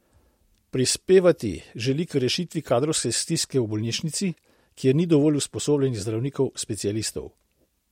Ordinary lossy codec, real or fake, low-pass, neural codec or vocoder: MP3, 64 kbps; real; 19.8 kHz; none